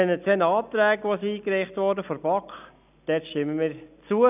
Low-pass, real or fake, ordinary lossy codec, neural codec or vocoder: 3.6 kHz; real; none; none